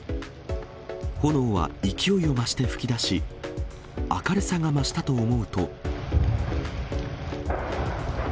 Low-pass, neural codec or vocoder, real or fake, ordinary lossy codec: none; none; real; none